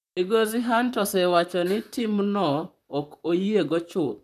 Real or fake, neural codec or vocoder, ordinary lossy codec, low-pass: fake; vocoder, 44.1 kHz, 128 mel bands, Pupu-Vocoder; none; 14.4 kHz